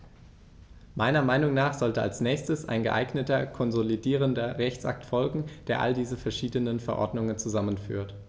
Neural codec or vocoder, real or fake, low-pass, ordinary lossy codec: none; real; none; none